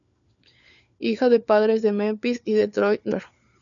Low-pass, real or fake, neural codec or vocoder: 7.2 kHz; fake; codec, 16 kHz, 4 kbps, FunCodec, trained on LibriTTS, 50 frames a second